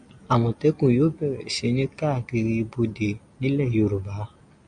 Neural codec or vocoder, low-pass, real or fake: none; 9.9 kHz; real